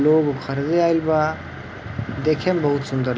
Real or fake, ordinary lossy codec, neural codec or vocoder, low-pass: real; none; none; none